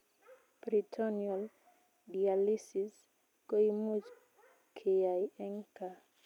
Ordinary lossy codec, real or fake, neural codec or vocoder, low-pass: none; real; none; 19.8 kHz